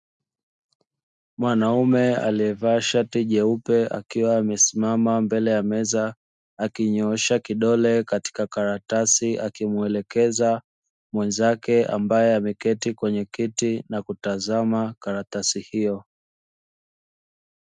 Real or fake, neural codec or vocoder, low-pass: real; none; 10.8 kHz